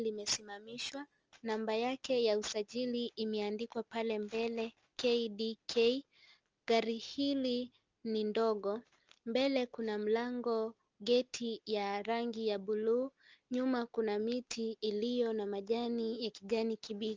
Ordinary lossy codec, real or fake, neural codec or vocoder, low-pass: Opus, 24 kbps; real; none; 7.2 kHz